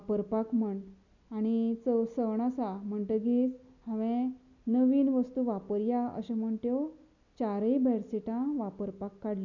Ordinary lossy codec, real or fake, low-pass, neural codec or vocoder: none; real; 7.2 kHz; none